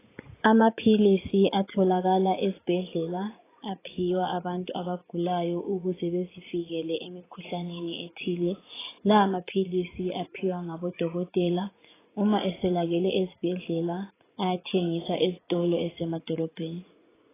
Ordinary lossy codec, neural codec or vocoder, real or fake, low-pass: AAC, 16 kbps; vocoder, 44.1 kHz, 80 mel bands, Vocos; fake; 3.6 kHz